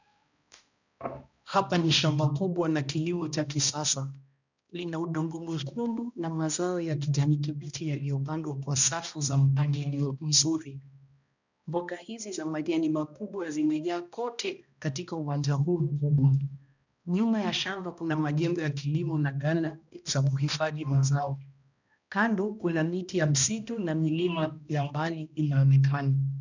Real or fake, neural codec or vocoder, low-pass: fake; codec, 16 kHz, 1 kbps, X-Codec, HuBERT features, trained on balanced general audio; 7.2 kHz